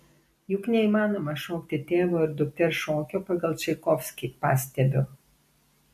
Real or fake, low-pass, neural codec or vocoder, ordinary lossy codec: real; 14.4 kHz; none; MP3, 64 kbps